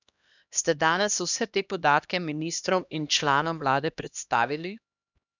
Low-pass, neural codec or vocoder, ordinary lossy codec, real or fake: 7.2 kHz; codec, 16 kHz, 1 kbps, X-Codec, HuBERT features, trained on LibriSpeech; none; fake